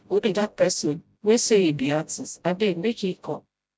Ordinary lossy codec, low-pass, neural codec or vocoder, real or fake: none; none; codec, 16 kHz, 0.5 kbps, FreqCodec, smaller model; fake